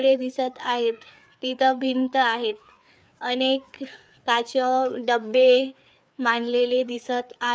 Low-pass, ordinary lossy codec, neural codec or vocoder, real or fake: none; none; codec, 16 kHz, 4 kbps, FreqCodec, larger model; fake